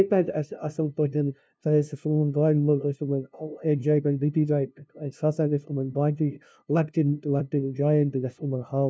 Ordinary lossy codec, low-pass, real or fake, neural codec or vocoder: none; none; fake; codec, 16 kHz, 0.5 kbps, FunCodec, trained on LibriTTS, 25 frames a second